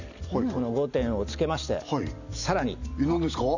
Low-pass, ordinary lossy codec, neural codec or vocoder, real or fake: 7.2 kHz; none; none; real